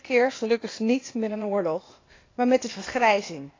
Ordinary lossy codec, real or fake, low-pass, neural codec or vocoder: AAC, 32 kbps; fake; 7.2 kHz; codec, 16 kHz, 0.8 kbps, ZipCodec